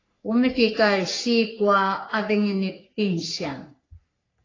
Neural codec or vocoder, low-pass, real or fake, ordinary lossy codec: codec, 44.1 kHz, 3.4 kbps, Pupu-Codec; 7.2 kHz; fake; AAC, 32 kbps